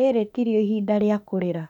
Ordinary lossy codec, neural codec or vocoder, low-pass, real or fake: none; autoencoder, 48 kHz, 32 numbers a frame, DAC-VAE, trained on Japanese speech; 19.8 kHz; fake